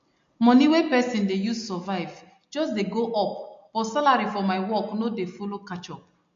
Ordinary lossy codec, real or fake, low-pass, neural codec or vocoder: MP3, 48 kbps; real; 7.2 kHz; none